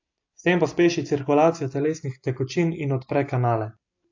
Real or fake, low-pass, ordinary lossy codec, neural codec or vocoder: real; 7.2 kHz; AAC, 48 kbps; none